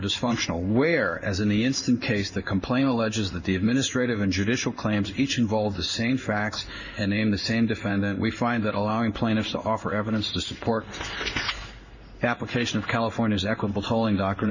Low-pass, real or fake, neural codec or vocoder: 7.2 kHz; fake; codec, 16 kHz in and 24 kHz out, 1 kbps, XY-Tokenizer